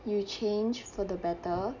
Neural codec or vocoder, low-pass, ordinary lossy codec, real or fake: none; 7.2 kHz; none; real